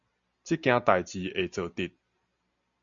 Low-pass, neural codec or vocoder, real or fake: 7.2 kHz; none; real